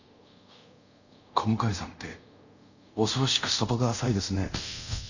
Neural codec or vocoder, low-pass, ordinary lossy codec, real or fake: codec, 24 kHz, 0.5 kbps, DualCodec; 7.2 kHz; none; fake